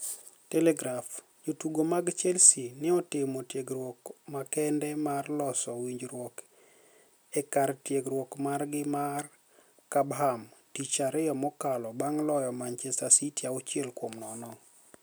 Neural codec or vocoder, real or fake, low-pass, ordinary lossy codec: none; real; none; none